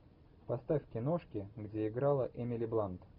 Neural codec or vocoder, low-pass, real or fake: none; 5.4 kHz; real